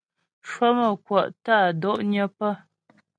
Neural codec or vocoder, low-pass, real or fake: none; 9.9 kHz; real